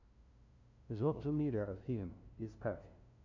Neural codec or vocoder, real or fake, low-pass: codec, 16 kHz, 0.5 kbps, FunCodec, trained on LibriTTS, 25 frames a second; fake; 7.2 kHz